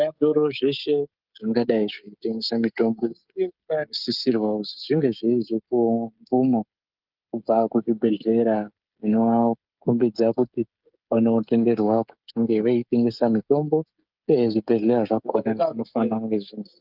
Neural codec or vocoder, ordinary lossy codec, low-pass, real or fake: codec, 16 kHz, 16 kbps, FreqCodec, smaller model; Opus, 24 kbps; 5.4 kHz; fake